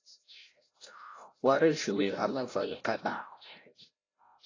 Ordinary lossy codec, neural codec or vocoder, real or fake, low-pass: AAC, 32 kbps; codec, 16 kHz, 0.5 kbps, FreqCodec, larger model; fake; 7.2 kHz